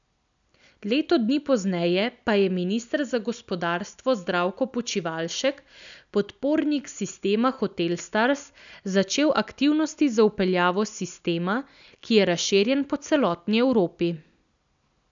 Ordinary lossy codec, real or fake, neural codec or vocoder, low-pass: none; real; none; 7.2 kHz